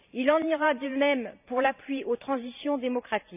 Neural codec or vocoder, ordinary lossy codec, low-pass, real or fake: vocoder, 44.1 kHz, 128 mel bands every 512 samples, BigVGAN v2; none; 3.6 kHz; fake